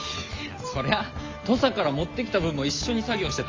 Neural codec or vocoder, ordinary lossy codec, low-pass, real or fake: none; Opus, 32 kbps; 7.2 kHz; real